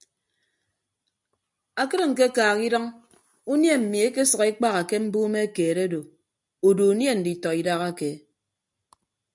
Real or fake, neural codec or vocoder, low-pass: real; none; 10.8 kHz